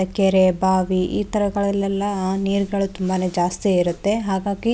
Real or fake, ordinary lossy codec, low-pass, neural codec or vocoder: real; none; none; none